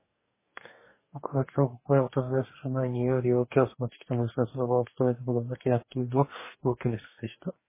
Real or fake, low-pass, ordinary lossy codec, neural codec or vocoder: fake; 3.6 kHz; MP3, 16 kbps; codec, 44.1 kHz, 2.6 kbps, DAC